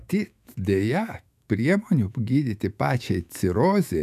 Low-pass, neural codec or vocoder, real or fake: 14.4 kHz; autoencoder, 48 kHz, 128 numbers a frame, DAC-VAE, trained on Japanese speech; fake